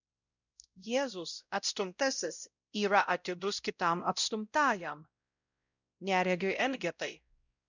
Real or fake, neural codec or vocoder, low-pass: fake; codec, 16 kHz, 0.5 kbps, X-Codec, WavLM features, trained on Multilingual LibriSpeech; 7.2 kHz